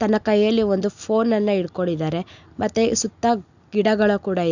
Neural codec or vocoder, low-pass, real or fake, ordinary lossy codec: none; 7.2 kHz; real; none